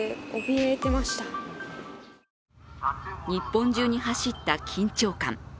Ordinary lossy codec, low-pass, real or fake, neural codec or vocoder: none; none; real; none